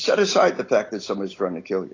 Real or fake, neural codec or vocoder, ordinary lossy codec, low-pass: real; none; AAC, 32 kbps; 7.2 kHz